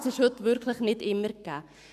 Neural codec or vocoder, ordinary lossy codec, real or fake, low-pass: codec, 44.1 kHz, 7.8 kbps, Pupu-Codec; none; fake; 14.4 kHz